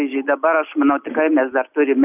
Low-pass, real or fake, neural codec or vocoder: 3.6 kHz; real; none